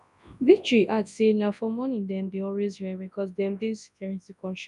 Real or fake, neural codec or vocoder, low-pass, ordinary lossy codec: fake; codec, 24 kHz, 0.9 kbps, WavTokenizer, large speech release; 10.8 kHz; none